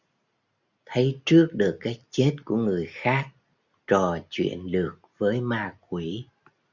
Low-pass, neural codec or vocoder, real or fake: 7.2 kHz; none; real